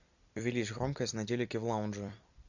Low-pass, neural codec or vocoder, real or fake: 7.2 kHz; vocoder, 44.1 kHz, 128 mel bands every 256 samples, BigVGAN v2; fake